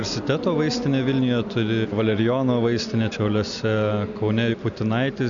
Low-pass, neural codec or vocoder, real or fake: 7.2 kHz; none; real